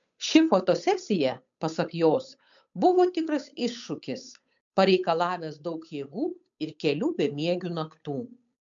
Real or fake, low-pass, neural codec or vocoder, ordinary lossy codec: fake; 7.2 kHz; codec, 16 kHz, 8 kbps, FunCodec, trained on Chinese and English, 25 frames a second; MP3, 64 kbps